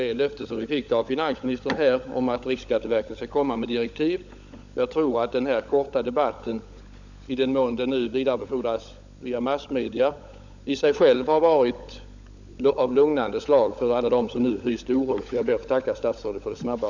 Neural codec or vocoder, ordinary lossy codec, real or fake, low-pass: codec, 16 kHz, 16 kbps, FunCodec, trained on LibriTTS, 50 frames a second; none; fake; 7.2 kHz